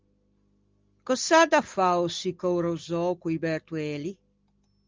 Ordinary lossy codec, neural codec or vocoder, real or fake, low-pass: Opus, 32 kbps; none; real; 7.2 kHz